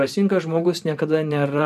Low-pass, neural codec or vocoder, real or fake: 14.4 kHz; vocoder, 44.1 kHz, 128 mel bands every 512 samples, BigVGAN v2; fake